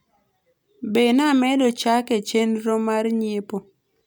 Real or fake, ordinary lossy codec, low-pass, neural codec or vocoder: real; none; none; none